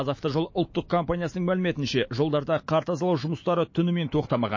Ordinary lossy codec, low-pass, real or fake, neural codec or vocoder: MP3, 32 kbps; 7.2 kHz; fake; autoencoder, 48 kHz, 128 numbers a frame, DAC-VAE, trained on Japanese speech